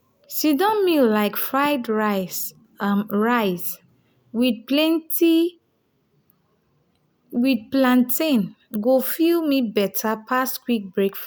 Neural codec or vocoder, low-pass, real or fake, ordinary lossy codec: none; none; real; none